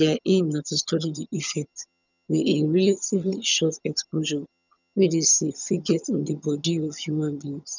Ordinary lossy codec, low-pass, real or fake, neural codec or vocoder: none; 7.2 kHz; fake; vocoder, 22.05 kHz, 80 mel bands, HiFi-GAN